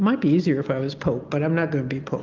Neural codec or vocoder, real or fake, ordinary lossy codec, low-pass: none; real; Opus, 32 kbps; 7.2 kHz